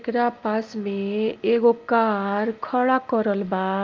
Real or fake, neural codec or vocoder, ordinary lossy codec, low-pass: real; none; Opus, 24 kbps; 7.2 kHz